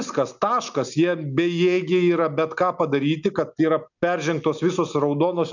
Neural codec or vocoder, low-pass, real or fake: none; 7.2 kHz; real